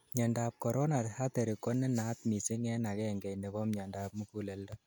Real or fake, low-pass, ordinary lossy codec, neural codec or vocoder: real; none; none; none